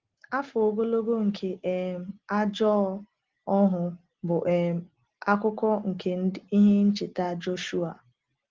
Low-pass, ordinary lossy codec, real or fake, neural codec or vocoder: 7.2 kHz; Opus, 16 kbps; real; none